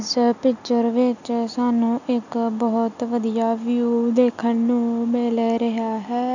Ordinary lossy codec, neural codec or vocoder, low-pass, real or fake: none; none; 7.2 kHz; real